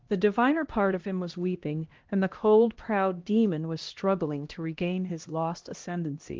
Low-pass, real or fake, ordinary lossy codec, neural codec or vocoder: 7.2 kHz; fake; Opus, 16 kbps; codec, 16 kHz, 1 kbps, X-Codec, HuBERT features, trained on LibriSpeech